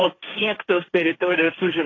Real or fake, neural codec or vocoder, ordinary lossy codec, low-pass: fake; codec, 16 kHz, 1.1 kbps, Voila-Tokenizer; AAC, 32 kbps; 7.2 kHz